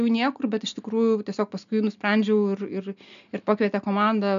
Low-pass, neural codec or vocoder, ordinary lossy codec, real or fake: 7.2 kHz; none; MP3, 96 kbps; real